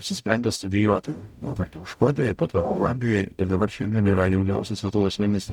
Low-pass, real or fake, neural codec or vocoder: 19.8 kHz; fake; codec, 44.1 kHz, 0.9 kbps, DAC